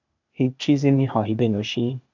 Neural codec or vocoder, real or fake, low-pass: codec, 16 kHz, 0.8 kbps, ZipCodec; fake; 7.2 kHz